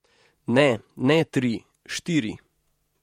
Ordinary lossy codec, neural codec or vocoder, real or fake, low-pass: MP3, 64 kbps; vocoder, 48 kHz, 128 mel bands, Vocos; fake; 19.8 kHz